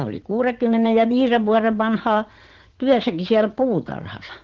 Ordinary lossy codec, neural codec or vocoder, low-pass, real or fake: Opus, 16 kbps; vocoder, 44.1 kHz, 80 mel bands, Vocos; 7.2 kHz; fake